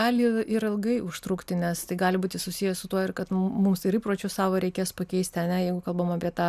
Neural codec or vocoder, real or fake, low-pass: none; real; 14.4 kHz